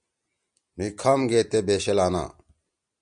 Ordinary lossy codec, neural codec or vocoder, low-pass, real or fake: MP3, 96 kbps; none; 9.9 kHz; real